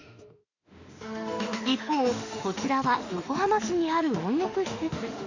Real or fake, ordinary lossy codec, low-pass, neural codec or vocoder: fake; none; 7.2 kHz; autoencoder, 48 kHz, 32 numbers a frame, DAC-VAE, trained on Japanese speech